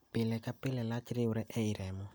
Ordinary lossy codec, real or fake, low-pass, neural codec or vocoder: none; real; none; none